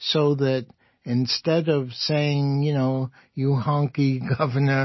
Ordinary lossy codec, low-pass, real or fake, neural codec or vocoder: MP3, 24 kbps; 7.2 kHz; real; none